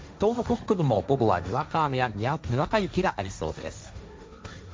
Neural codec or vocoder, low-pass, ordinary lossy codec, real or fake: codec, 16 kHz, 1.1 kbps, Voila-Tokenizer; none; none; fake